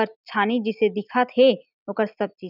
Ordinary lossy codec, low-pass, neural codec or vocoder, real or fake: none; 5.4 kHz; none; real